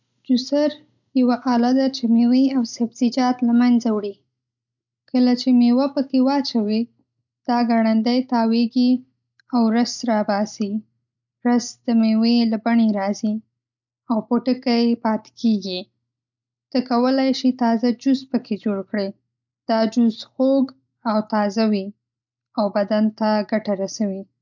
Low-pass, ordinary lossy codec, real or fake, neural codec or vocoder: 7.2 kHz; none; real; none